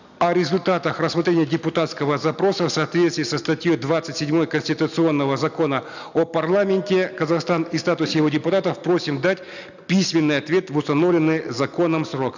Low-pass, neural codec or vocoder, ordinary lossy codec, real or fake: 7.2 kHz; none; none; real